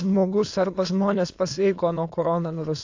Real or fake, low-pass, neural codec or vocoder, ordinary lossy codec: fake; 7.2 kHz; autoencoder, 22.05 kHz, a latent of 192 numbers a frame, VITS, trained on many speakers; AAC, 48 kbps